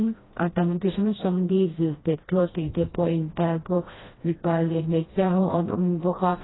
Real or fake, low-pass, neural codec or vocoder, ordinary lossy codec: fake; 7.2 kHz; codec, 16 kHz, 1 kbps, FreqCodec, smaller model; AAC, 16 kbps